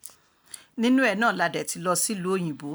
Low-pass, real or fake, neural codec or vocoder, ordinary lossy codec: none; real; none; none